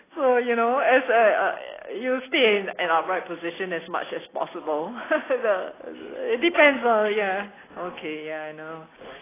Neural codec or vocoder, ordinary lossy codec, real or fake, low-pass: none; AAC, 16 kbps; real; 3.6 kHz